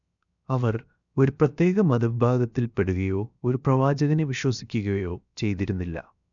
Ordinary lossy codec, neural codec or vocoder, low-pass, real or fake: none; codec, 16 kHz, 0.7 kbps, FocalCodec; 7.2 kHz; fake